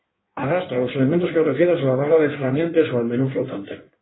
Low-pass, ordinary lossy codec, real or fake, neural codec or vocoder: 7.2 kHz; AAC, 16 kbps; fake; codec, 16 kHz in and 24 kHz out, 1.1 kbps, FireRedTTS-2 codec